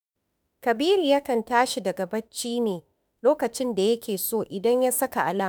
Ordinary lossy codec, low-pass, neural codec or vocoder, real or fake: none; none; autoencoder, 48 kHz, 32 numbers a frame, DAC-VAE, trained on Japanese speech; fake